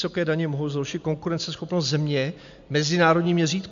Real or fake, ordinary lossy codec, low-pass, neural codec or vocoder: real; MP3, 64 kbps; 7.2 kHz; none